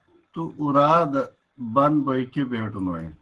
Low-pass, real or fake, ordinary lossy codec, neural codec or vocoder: 10.8 kHz; fake; Opus, 16 kbps; codec, 44.1 kHz, 7.8 kbps, Pupu-Codec